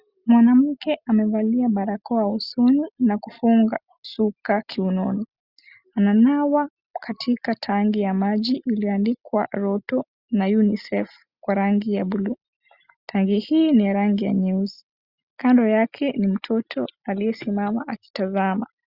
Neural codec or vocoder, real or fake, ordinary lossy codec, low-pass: none; real; Opus, 64 kbps; 5.4 kHz